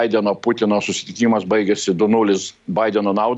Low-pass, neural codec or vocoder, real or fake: 10.8 kHz; none; real